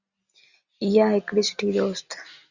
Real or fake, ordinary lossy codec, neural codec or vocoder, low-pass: real; Opus, 64 kbps; none; 7.2 kHz